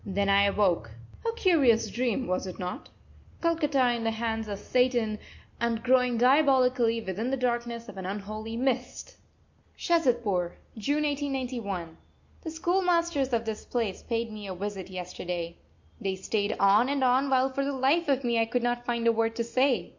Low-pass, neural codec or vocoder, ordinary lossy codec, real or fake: 7.2 kHz; none; MP3, 48 kbps; real